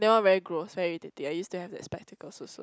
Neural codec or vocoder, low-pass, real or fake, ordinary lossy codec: none; none; real; none